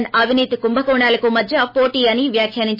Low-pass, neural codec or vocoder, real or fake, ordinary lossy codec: 5.4 kHz; autoencoder, 48 kHz, 128 numbers a frame, DAC-VAE, trained on Japanese speech; fake; MP3, 24 kbps